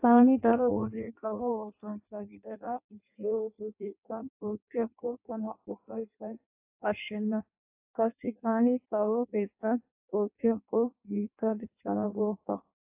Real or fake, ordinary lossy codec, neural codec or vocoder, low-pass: fake; AAC, 32 kbps; codec, 16 kHz in and 24 kHz out, 0.6 kbps, FireRedTTS-2 codec; 3.6 kHz